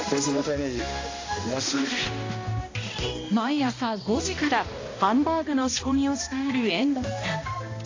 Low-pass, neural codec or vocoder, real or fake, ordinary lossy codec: 7.2 kHz; codec, 16 kHz, 1 kbps, X-Codec, HuBERT features, trained on balanced general audio; fake; AAC, 32 kbps